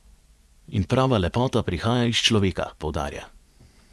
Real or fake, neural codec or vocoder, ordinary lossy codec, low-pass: fake; vocoder, 24 kHz, 100 mel bands, Vocos; none; none